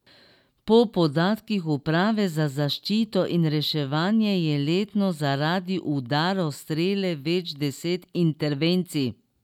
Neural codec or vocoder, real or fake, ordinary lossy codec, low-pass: none; real; none; 19.8 kHz